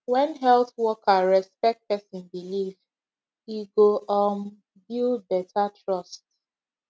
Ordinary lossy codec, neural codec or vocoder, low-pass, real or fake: none; none; none; real